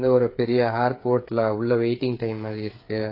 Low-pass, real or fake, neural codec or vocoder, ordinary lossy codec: 5.4 kHz; fake; codec, 16 kHz, 8 kbps, FreqCodec, smaller model; AAC, 32 kbps